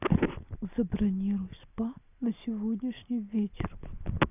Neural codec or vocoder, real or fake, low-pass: none; real; 3.6 kHz